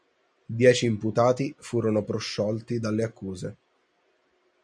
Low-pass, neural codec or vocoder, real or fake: 9.9 kHz; none; real